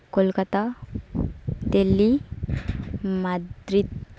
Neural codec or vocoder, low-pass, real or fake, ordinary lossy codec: none; none; real; none